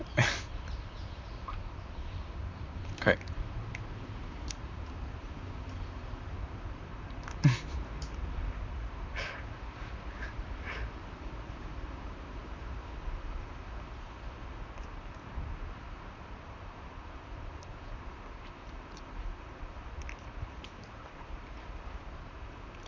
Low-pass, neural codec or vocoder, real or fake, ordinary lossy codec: 7.2 kHz; vocoder, 44.1 kHz, 128 mel bands, Pupu-Vocoder; fake; MP3, 64 kbps